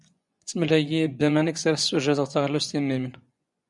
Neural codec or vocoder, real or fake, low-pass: vocoder, 24 kHz, 100 mel bands, Vocos; fake; 10.8 kHz